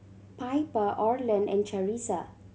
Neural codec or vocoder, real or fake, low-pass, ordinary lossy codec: none; real; none; none